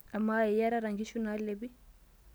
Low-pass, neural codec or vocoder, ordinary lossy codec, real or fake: none; none; none; real